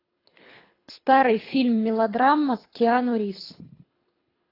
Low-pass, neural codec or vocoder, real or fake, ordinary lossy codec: 5.4 kHz; codec, 24 kHz, 3 kbps, HILCodec; fake; AAC, 24 kbps